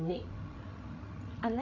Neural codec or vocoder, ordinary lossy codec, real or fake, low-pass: codec, 16 kHz, 16 kbps, FreqCodec, larger model; AAC, 32 kbps; fake; 7.2 kHz